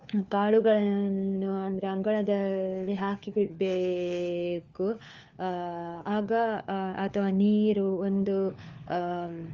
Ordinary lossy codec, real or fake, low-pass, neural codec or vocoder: Opus, 32 kbps; fake; 7.2 kHz; codec, 16 kHz, 4 kbps, FunCodec, trained on LibriTTS, 50 frames a second